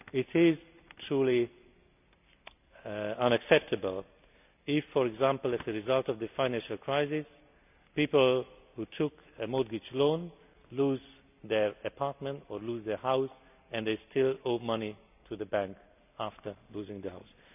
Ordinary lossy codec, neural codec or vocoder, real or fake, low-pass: none; none; real; 3.6 kHz